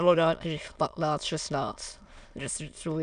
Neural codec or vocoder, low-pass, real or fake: autoencoder, 22.05 kHz, a latent of 192 numbers a frame, VITS, trained on many speakers; 9.9 kHz; fake